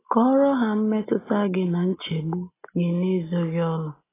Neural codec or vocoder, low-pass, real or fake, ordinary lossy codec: none; 3.6 kHz; real; AAC, 16 kbps